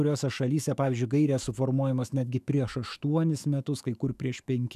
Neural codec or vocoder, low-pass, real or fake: autoencoder, 48 kHz, 128 numbers a frame, DAC-VAE, trained on Japanese speech; 14.4 kHz; fake